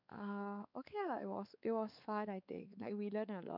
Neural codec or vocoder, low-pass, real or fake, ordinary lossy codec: codec, 16 kHz, 4 kbps, X-Codec, WavLM features, trained on Multilingual LibriSpeech; 5.4 kHz; fake; none